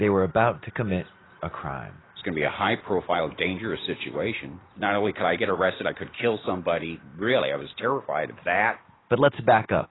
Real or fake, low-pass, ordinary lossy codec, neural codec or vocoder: real; 7.2 kHz; AAC, 16 kbps; none